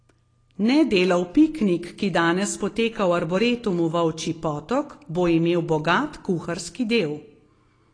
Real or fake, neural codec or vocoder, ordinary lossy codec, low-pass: real; none; AAC, 32 kbps; 9.9 kHz